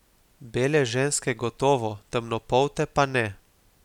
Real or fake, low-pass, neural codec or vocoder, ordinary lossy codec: real; 19.8 kHz; none; none